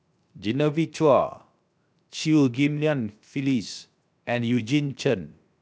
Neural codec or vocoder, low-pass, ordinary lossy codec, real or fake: codec, 16 kHz, 0.7 kbps, FocalCodec; none; none; fake